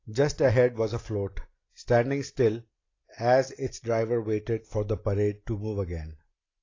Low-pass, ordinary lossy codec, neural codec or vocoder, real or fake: 7.2 kHz; AAC, 32 kbps; none; real